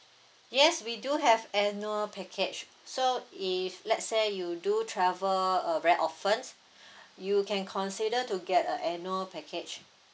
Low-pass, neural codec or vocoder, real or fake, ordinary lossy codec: none; none; real; none